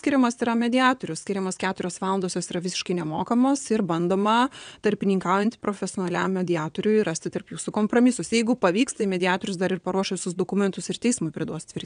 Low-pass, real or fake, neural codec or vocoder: 9.9 kHz; fake; vocoder, 22.05 kHz, 80 mel bands, WaveNeXt